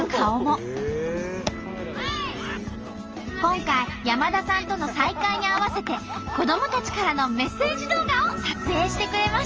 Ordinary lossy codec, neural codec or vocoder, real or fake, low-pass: Opus, 24 kbps; none; real; 7.2 kHz